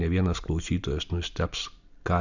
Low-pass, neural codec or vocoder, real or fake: 7.2 kHz; none; real